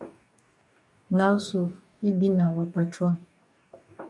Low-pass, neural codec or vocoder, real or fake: 10.8 kHz; codec, 44.1 kHz, 2.6 kbps, DAC; fake